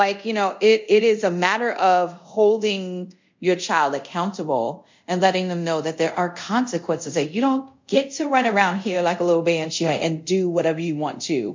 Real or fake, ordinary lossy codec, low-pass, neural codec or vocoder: fake; MP3, 64 kbps; 7.2 kHz; codec, 24 kHz, 0.5 kbps, DualCodec